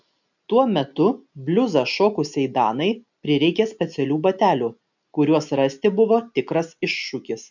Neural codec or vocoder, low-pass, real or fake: none; 7.2 kHz; real